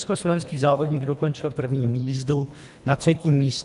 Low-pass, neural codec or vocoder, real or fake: 10.8 kHz; codec, 24 kHz, 1.5 kbps, HILCodec; fake